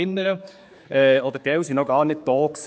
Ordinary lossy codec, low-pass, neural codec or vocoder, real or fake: none; none; codec, 16 kHz, 4 kbps, X-Codec, HuBERT features, trained on general audio; fake